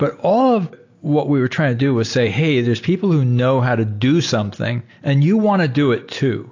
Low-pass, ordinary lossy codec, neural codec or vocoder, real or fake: 7.2 kHz; AAC, 48 kbps; none; real